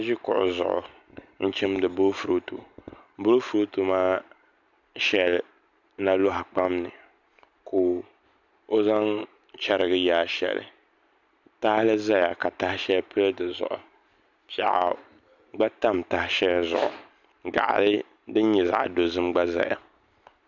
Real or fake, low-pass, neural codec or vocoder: real; 7.2 kHz; none